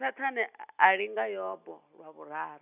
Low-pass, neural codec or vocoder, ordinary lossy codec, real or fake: 3.6 kHz; none; none; real